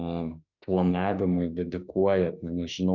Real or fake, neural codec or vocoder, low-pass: fake; autoencoder, 48 kHz, 32 numbers a frame, DAC-VAE, trained on Japanese speech; 7.2 kHz